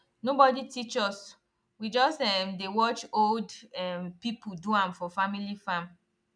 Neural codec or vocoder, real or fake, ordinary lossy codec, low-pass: none; real; none; 9.9 kHz